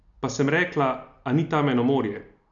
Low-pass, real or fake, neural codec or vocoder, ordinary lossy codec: 7.2 kHz; real; none; none